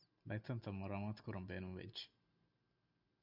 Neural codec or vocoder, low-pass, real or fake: none; 5.4 kHz; real